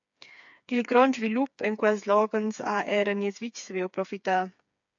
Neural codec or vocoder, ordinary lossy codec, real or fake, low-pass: codec, 16 kHz, 4 kbps, FreqCodec, smaller model; AAC, 64 kbps; fake; 7.2 kHz